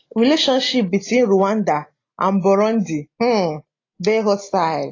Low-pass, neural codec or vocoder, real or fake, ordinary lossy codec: 7.2 kHz; none; real; AAC, 32 kbps